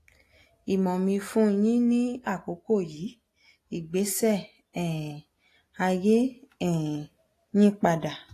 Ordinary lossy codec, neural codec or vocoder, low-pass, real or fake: AAC, 48 kbps; none; 14.4 kHz; real